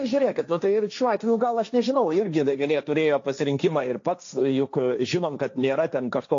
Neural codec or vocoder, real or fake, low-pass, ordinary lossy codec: codec, 16 kHz, 1.1 kbps, Voila-Tokenizer; fake; 7.2 kHz; AAC, 48 kbps